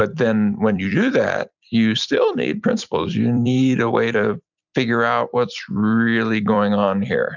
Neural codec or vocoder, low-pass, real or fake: vocoder, 44.1 kHz, 128 mel bands every 256 samples, BigVGAN v2; 7.2 kHz; fake